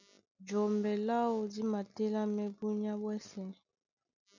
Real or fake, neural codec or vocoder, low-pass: real; none; 7.2 kHz